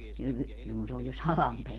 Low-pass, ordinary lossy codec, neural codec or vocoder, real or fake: 19.8 kHz; Opus, 16 kbps; none; real